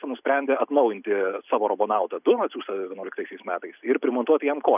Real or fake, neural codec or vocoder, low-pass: real; none; 3.6 kHz